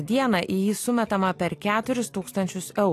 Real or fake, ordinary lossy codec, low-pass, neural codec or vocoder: real; AAC, 64 kbps; 14.4 kHz; none